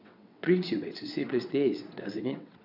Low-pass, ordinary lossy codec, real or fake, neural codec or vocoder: 5.4 kHz; none; fake; vocoder, 22.05 kHz, 80 mel bands, WaveNeXt